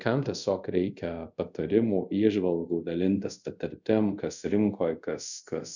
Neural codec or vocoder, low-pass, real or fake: codec, 24 kHz, 0.5 kbps, DualCodec; 7.2 kHz; fake